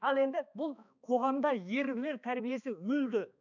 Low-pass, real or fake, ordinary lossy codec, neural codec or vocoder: 7.2 kHz; fake; none; codec, 16 kHz, 2 kbps, X-Codec, HuBERT features, trained on balanced general audio